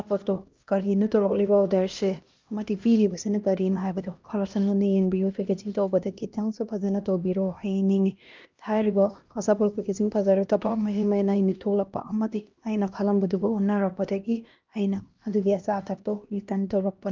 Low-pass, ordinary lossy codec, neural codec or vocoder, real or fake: 7.2 kHz; Opus, 24 kbps; codec, 16 kHz, 1 kbps, X-Codec, HuBERT features, trained on LibriSpeech; fake